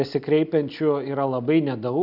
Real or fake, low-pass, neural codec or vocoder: real; 5.4 kHz; none